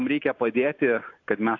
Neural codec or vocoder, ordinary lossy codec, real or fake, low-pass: none; AAC, 48 kbps; real; 7.2 kHz